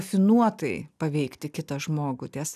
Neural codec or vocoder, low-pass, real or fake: none; 14.4 kHz; real